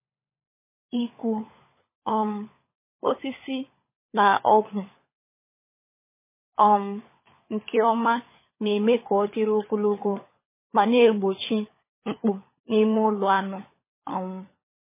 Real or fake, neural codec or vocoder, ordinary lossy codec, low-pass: fake; codec, 16 kHz, 16 kbps, FunCodec, trained on LibriTTS, 50 frames a second; MP3, 16 kbps; 3.6 kHz